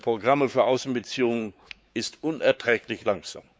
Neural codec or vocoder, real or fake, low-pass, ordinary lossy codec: codec, 16 kHz, 4 kbps, X-Codec, WavLM features, trained on Multilingual LibriSpeech; fake; none; none